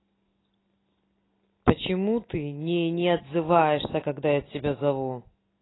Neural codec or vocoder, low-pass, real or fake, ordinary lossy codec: none; 7.2 kHz; real; AAC, 16 kbps